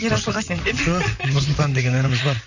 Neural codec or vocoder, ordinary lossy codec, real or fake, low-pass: vocoder, 44.1 kHz, 128 mel bands, Pupu-Vocoder; none; fake; 7.2 kHz